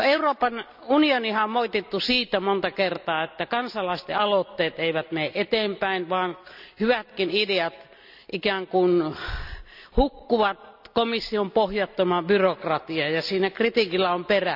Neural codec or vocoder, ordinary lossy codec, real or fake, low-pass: none; none; real; 5.4 kHz